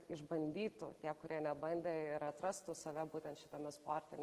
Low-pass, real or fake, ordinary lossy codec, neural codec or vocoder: 14.4 kHz; real; Opus, 16 kbps; none